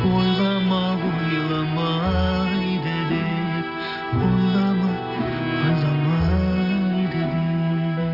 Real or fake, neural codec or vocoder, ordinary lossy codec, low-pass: real; none; none; 5.4 kHz